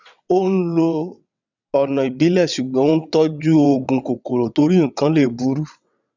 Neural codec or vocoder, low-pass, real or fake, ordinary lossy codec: vocoder, 22.05 kHz, 80 mel bands, WaveNeXt; 7.2 kHz; fake; none